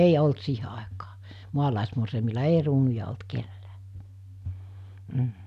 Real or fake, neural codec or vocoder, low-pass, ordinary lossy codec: real; none; 14.4 kHz; none